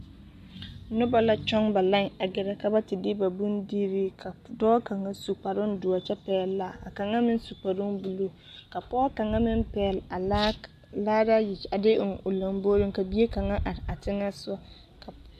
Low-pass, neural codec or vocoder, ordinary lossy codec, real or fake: 14.4 kHz; none; MP3, 64 kbps; real